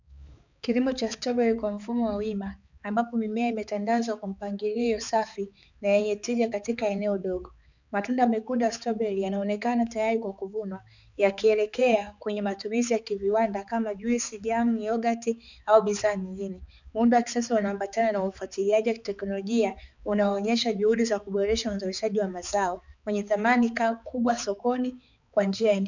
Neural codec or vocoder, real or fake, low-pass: codec, 16 kHz, 4 kbps, X-Codec, HuBERT features, trained on balanced general audio; fake; 7.2 kHz